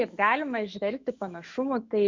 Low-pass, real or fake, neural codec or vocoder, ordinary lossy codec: 7.2 kHz; fake; autoencoder, 48 kHz, 128 numbers a frame, DAC-VAE, trained on Japanese speech; MP3, 64 kbps